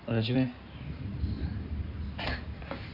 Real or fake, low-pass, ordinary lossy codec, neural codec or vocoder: fake; 5.4 kHz; none; codec, 16 kHz in and 24 kHz out, 2.2 kbps, FireRedTTS-2 codec